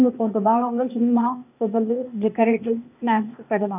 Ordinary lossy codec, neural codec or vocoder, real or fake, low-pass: none; codec, 16 kHz, 0.8 kbps, ZipCodec; fake; 3.6 kHz